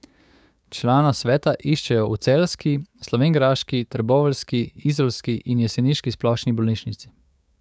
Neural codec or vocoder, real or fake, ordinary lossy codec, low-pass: codec, 16 kHz, 6 kbps, DAC; fake; none; none